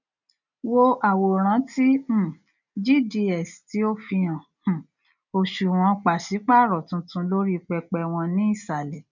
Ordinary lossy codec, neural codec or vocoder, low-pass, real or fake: none; none; 7.2 kHz; real